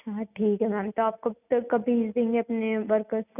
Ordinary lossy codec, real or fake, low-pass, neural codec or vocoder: none; real; 3.6 kHz; none